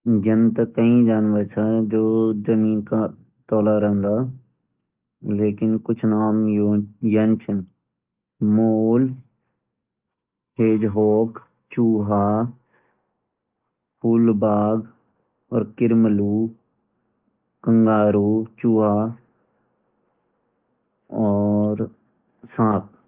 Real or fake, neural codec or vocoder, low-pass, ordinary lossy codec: real; none; 3.6 kHz; Opus, 32 kbps